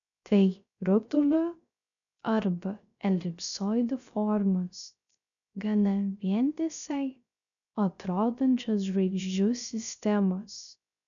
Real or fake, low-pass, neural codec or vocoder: fake; 7.2 kHz; codec, 16 kHz, 0.3 kbps, FocalCodec